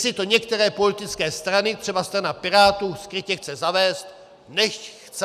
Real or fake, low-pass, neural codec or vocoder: real; 14.4 kHz; none